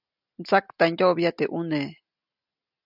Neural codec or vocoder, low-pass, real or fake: none; 5.4 kHz; real